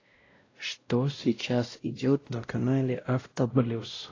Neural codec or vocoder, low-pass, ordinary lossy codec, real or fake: codec, 16 kHz, 0.5 kbps, X-Codec, WavLM features, trained on Multilingual LibriSpeech; 7.2 kHz; AAC, 32 kbps; fake